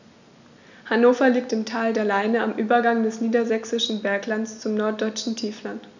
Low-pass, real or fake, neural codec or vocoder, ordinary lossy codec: 7.2 kHz; real; none; none